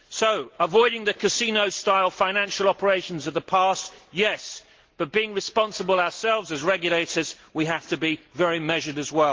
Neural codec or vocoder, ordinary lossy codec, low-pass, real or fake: none; Opus, 16 kbps; 7.2 kHz; real